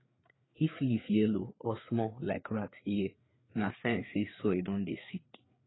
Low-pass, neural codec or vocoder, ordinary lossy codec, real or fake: 7.2 kHz; codec, 16 kHz, 4 kbps, FreqCodec, larger model; AAC, 16 kbps; fake